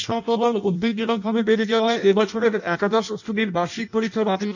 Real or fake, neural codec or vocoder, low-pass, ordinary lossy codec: fake; codec, 16 kHz in and 24 kHz out, 0.6 kbps, FireRedTTS-2 codec; 7.2 kHz; none